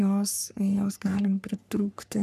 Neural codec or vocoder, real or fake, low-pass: codec, 44.1 kHz, 3.4 kbps, Pupu-Codec; fake; 14.4 kHz